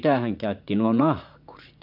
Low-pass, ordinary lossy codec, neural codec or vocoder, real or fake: 5.4 kHz; none; vocoder, 44.1 kHz, 80 mel bands, Vocos; fake